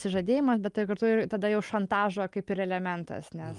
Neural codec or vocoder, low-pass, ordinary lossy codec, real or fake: none; 10.8 kHz; Opus, 32 kbps; real